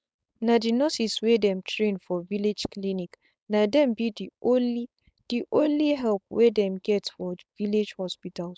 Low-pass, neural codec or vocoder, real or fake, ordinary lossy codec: none; codec, 16 kHz, 4.8 kbps, FACodec; fake; none